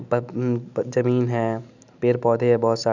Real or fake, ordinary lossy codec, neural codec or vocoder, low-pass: real; none; none; 7.2 kHz